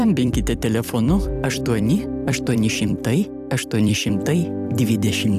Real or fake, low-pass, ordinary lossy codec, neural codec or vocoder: fake; 14.4 kHz; AAC, 96 kbps; vocoder, 44.1 kHz, 128 mel bands every 256 samples, BigVGAN v2